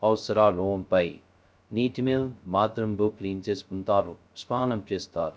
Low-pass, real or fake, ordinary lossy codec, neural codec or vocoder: none; fake; none; codec, 16 kHz, 0.2 kbps, FocalCodec